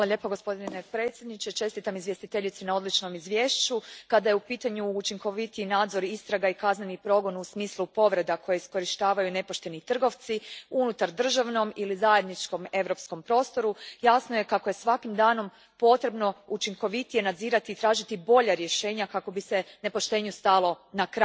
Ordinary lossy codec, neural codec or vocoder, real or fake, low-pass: none; none; real; none